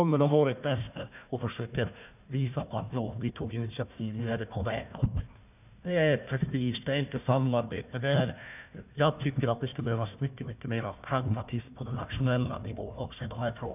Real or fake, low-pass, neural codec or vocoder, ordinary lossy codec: fake; 3.6 kHz; codec, 16 kHz, 1 kbps, FunCodec, trained on Chinese and English, 50 frames a second; none